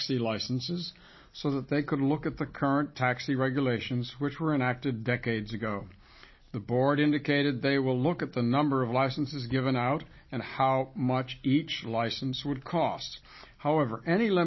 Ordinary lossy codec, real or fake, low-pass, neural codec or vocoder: MP3, 24 kbps; real; 7.2 kHz; none